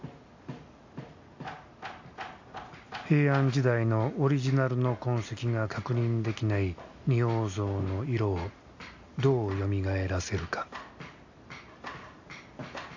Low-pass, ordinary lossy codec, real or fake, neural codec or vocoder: 7.2 kHz; AAC, 48 kbps; real; none